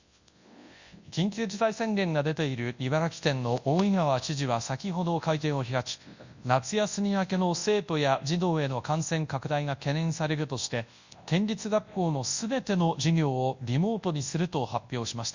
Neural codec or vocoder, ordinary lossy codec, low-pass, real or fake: codec, 24 kHz, 0.9 kbps, WavTokenizer, large speech release; Opus, 64 kbps; 7.2 kHz; fake